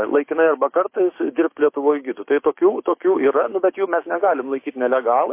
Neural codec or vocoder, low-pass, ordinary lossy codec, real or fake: codec, 16 kHz, 6 kbps, DAC; 3.6 kHz; MP3, 24 kbps; fake